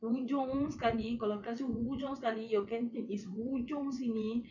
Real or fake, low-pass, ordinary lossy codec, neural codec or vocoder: fake; 7.2 kHz; none; vocoder, 22.05 kHz, 80 mel bands, WaveNeXt